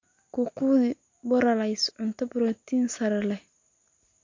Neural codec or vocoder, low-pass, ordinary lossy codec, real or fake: none; 7.2 kHz; MP3, 48 kbps; real